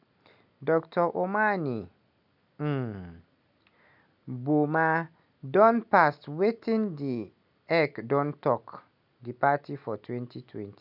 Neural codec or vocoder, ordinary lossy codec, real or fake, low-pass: none; none; real; 5.4 kHz